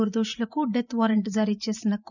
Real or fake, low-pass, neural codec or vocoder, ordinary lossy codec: fake; 7.2 kHz; vocoder, 44.1 kHz, 128 mel bands every 512 samples, BigVGAN v2; none